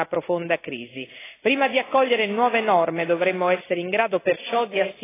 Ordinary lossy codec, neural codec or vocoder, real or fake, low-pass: AAC, 16 kbps; none; real; 3.6 kHz